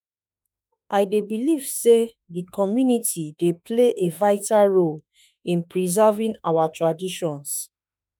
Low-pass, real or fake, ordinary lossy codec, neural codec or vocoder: none; fake; none; autoencoder, 48 kHz, 32 numbers a frame, DAC-VAE, trained on Japanese speech